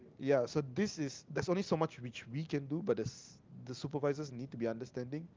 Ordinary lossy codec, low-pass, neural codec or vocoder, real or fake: Opus, 32 kbps; 7.2 kHz; none; real